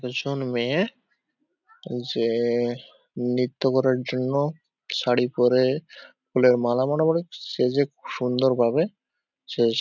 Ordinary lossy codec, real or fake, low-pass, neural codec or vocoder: none; real; 7.2 kHz; none